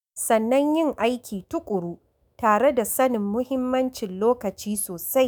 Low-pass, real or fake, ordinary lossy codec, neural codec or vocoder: none; fake; none; autoencoder, 48 kHz, 128 numbers a frame, DAC-VAE, trained on Japanese speech